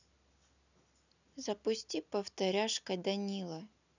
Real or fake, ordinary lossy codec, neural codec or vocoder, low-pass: real; none; none; 7.2 kHz